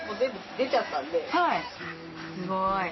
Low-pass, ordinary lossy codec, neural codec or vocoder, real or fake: 7.2 kHz; MP3, 24 kbps; none; real